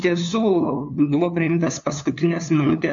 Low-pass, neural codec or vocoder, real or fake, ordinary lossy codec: 7.2 kHz; codec, 16 kHz, 2 kbps, FunCodec, trained on LibriTTS, 25 frames a second; fake; AAC, 64 kbps